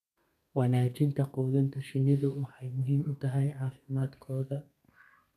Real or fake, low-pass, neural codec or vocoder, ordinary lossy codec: fake; 14.4 kHz; codec, 32 kHz, 1.9 kbps, SNAC; none